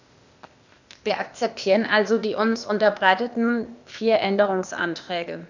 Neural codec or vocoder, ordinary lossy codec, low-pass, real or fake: codec, 16 kHz, 0.8 kbps, ZipCodec; none; 7.2 kHz; fake